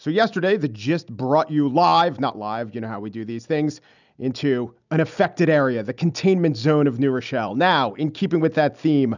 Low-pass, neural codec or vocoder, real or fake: 7.2 kHz; none; real